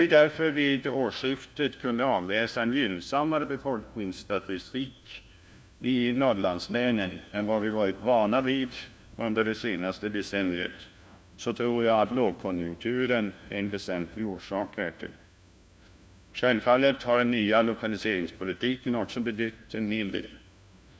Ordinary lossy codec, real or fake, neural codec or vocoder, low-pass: none; fake; codec, 16 kHz, 1 kbps, FunCodec, trained on LibriTTS, 50 frames a second; none